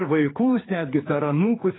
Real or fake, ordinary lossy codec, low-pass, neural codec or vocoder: fake; AAC, 16 kbps; 7.2 kHz; codec, 16 kHz, 4 kbps, X-Codec, HuBERT features, trained on general audio